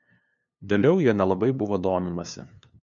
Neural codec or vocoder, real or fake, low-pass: codec, 16 kHz, 2 kbps, FunCodec, trained on LibriTTS, 25 frames a second; fake; 7.2 kHz